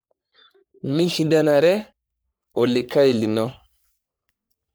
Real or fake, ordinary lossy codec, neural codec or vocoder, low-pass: fake; none; codec, 44.1 kHz, 3.4 kbps, Pupu-Codec; none